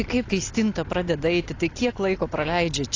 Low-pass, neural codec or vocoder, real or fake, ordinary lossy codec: 7.2 kHz; none; real; AAC, 32 kbps